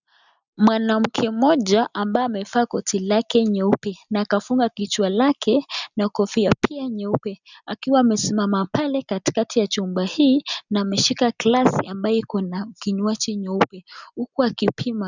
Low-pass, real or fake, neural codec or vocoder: 7.2 kHz; real; none